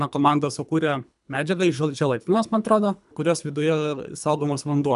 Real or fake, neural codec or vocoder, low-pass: fake; codec, 24 kHz, 3 kbps, HILCodec; 10.8 kHz